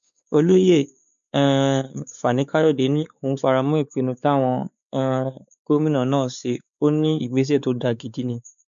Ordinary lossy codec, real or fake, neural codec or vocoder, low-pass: MP3, 96 kbps; fake; codec, 16 kHz, 4 kbps, X-Codec, WavLM features, trained on Multilingual LibriSpeech; 7.2 kHz